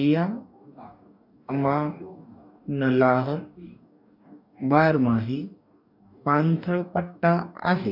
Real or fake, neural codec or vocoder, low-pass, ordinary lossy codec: fake; codec, 44.1 kHz, 2.6 kbps, DAC; 5.4 kHz; none